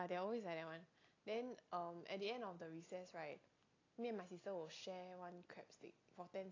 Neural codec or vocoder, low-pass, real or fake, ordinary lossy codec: none; 7.2 kHz; real; AAC, 32 kbps